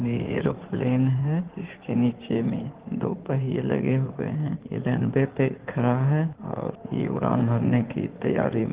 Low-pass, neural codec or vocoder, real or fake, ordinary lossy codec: 3.6 kHz; codec, 16 kHz in and 24 kHz out, 2.2 kbps, FireRedTTS-2 codec; fake; Opus, 16 kbps